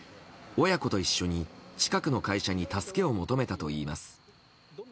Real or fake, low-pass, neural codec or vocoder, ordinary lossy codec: real; none; none; none